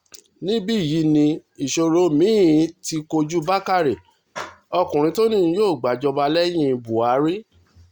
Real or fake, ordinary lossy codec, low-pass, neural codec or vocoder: real; Opus, 64 kbps; 19.8 kHz; none